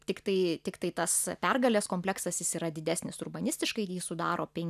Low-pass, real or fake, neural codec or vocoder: 14.4 kHz; real; none